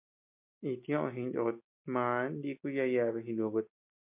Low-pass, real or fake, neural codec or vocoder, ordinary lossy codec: 3.6 kHz; real; none; MP3, 32 kbps